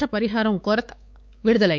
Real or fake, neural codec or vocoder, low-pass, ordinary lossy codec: fake; codec, 16 kHz, 4 kbps, X-Codec, WavLM features, trained on Multilingual LibriSpeech; none; none